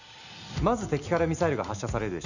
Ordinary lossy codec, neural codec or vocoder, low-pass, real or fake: none; none; 7.2 kHz; real